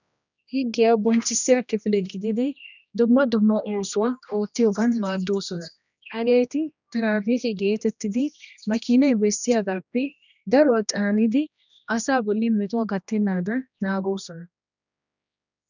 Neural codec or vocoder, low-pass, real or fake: codec, 16 kHz, 1 kbps, X-Codec, HuBERT features, trained on general audio; 7.2 kHz; fake